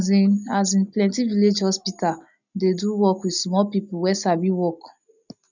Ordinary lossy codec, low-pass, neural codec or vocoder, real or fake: none; 7.2 kHz; none; real